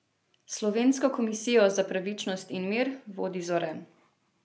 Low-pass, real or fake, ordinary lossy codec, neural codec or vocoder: none; real; none; none